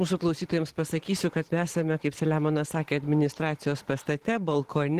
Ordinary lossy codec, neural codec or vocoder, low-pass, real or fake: Opus, 16 kbps; vocoder, 44.1 kHz, 128 mel bands every 512 samples, BigVGAN v2; 14.4 kHz; fake